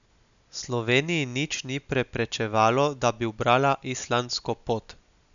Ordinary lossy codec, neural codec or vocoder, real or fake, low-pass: none; none; real; 7.2 kHz